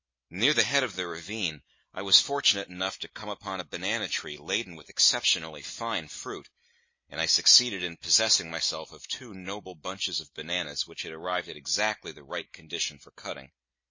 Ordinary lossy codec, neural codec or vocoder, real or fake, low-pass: MP3, 32 kbps; none; real; 7.2 kHz